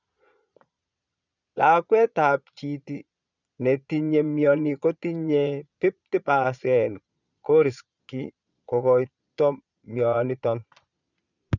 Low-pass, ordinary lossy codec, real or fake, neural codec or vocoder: 7.2 kHz; none; fake; vocoder, 22.05 kHz, 80 mel bands, Vocos